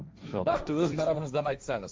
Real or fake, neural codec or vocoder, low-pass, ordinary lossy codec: fake; codec, 16 kHz, 1.1 kbps, Voila-Tokenizer; none; none